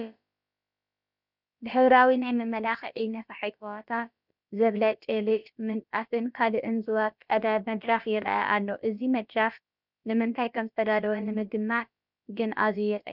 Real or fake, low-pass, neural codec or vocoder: fake; 5.4 kHz; codec, 16 kHz, about 1 kbps, DyCAST, with the encoder's durations